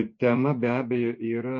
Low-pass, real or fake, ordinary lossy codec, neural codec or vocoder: 7.2 kHz; fake; MP3, 32 kbps; vocoder, 24 kHz, 100 mel bands, Vocos